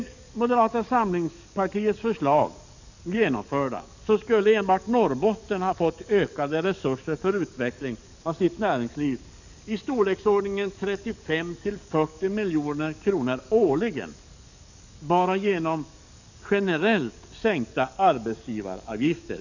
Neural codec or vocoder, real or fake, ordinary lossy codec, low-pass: codec, 44.1 kHz, 7.8 kbps, DAC; fake; none; 7.2 kHz